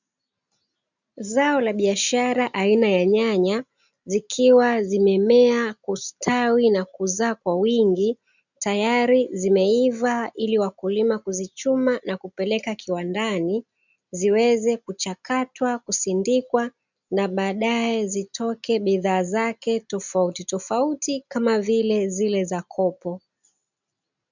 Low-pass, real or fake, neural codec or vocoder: 7.2 kHz; real; none